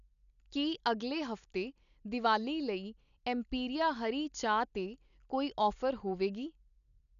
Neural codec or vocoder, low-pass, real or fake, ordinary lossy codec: none; 7.2 kHz; real; none